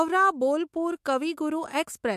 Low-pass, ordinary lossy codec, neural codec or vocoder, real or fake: 14.4 kHz; MP3, 64 kbps; autoencoder, 48 kHz, 128 numbers a frame, DAC-VAE, trained on Japanese speech; fake